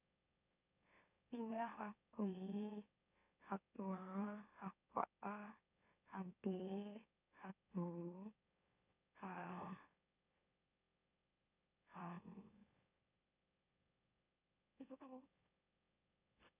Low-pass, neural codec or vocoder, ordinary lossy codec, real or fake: 3.6 kHz; autoencoder, 44.1 kHz, a latent of 192 numbers a frame, MeloTTS; none; fake